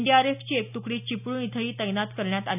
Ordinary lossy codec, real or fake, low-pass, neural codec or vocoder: none; real; 3.6 kHz; none